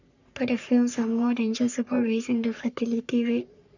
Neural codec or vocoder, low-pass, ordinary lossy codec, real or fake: codec, 44.1 kHz, 3.4 kbps, Pupu-Codec; 7.2 kHz; none; fake